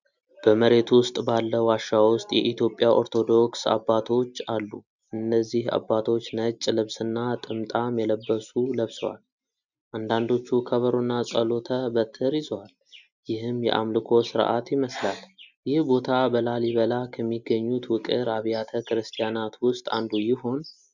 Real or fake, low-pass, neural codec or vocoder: real; 7.2 kHz; none